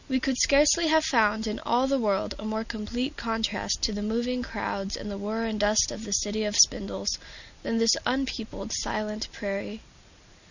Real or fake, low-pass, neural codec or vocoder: real; 7.2 kHz; none